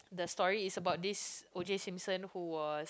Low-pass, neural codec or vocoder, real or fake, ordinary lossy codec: none; none; real; none